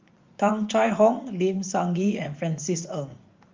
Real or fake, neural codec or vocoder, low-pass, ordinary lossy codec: fake; vocoder, 44.1 kHz, 80 mel bands, Vocos; 7.2 kHz; Opus, 32 kbps